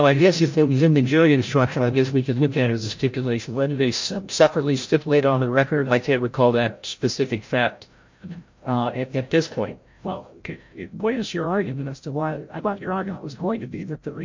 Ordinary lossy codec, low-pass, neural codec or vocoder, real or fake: MP3, 48 kbps; 7.2 kHz; codec, 16 kHz, 0.5 kbps, FreqCodec, larger model; fake